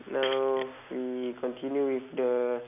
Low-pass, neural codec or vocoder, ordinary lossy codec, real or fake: 3.6 kHz; none; none; real